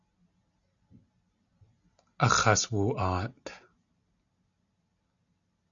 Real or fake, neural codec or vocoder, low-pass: real; none; 7.2 kHz